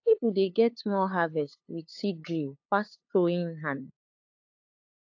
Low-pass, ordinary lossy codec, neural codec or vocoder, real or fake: 7.2 kHz; AAC, 48 kbps; codec, 16 kHz, 2 kbps, FunCodec, trained on LibriTTS, 25 frames a second; fake